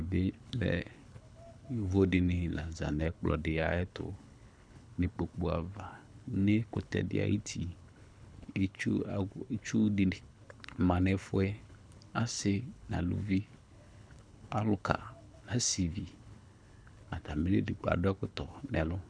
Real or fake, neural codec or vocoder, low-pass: fake; codec, 24 kHz, 6 kbps, HILCodec; 9.9 kHz